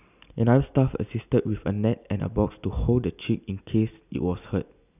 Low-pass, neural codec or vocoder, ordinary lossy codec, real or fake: 3.6 kHz; none; none; real